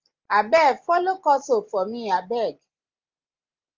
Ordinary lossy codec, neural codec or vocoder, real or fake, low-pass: Opus, 24 kbps; none; real; 7.2 kHz